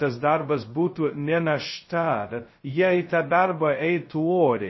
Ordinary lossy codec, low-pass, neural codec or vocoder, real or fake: MP3, 24 kbps; 7.2 kHz; codec, 16 kHz, 0.2 kbps, FocalCodec; fake